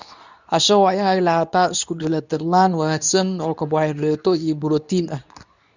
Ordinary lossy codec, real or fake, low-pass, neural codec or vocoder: none; fake; 7.2 kHz; codec, 24 kHz, 0.9 kbps, WavTokenizer, medium speech release version 2